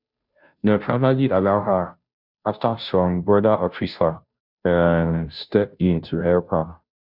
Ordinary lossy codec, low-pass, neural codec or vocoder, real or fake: none; 5.4 kHz; codec, 16 kHz, 0.5 kbps, FunCodec, trained on Chinese and English, 25 frames a second; fake